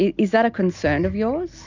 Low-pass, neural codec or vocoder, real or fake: 7.2 kHz; none; real